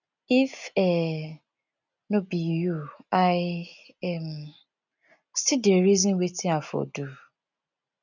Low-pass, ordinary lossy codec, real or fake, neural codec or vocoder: 7.2 kHz; none; real; none